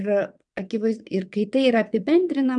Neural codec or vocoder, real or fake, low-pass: vocoder, 22.05 kHz, 80 mel bands, WaveNeXt; fake; 9.9 kHz